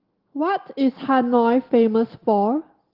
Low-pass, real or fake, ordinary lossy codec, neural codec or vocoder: 5.4 kHz; real; Opus, 16 kbps; none